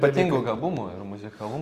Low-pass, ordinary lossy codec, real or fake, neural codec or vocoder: 14.4 kHz; Opus, 32 kbps; real; none